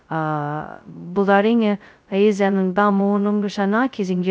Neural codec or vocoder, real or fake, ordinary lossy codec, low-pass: codec, 16 kHz, 0.2 kbps, FocalCodec; fake; none; none